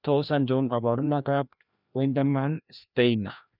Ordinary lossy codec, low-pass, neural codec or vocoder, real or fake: none; 5.4 kHz; codec, 16 kHz, 1 kbps, FreqCodec, larger model; fake